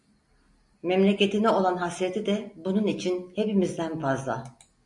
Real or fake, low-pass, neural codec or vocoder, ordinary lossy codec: real; 10.8 kHz; none; MP3, 48 kbps